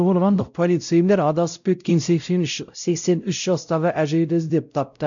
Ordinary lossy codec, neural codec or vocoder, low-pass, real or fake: none; codec, 16 kHz, 0.5 kbps, X-Codec, WavLM features, trained on Multilingual LibriSpeech; 7.2 kHz; fake